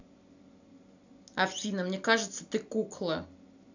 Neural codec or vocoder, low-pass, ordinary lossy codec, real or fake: none; 7.2 kHz; none; real